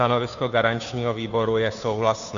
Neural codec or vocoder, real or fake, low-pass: codec, 16 kHz, 2 kbps, FunCodec, trained on Chinese and English, 25 frames a second; fake; 7.2 kHz